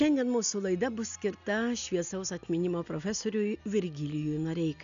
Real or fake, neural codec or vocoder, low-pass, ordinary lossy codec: real; none; 7.2 kHz; AAC, 96 kbps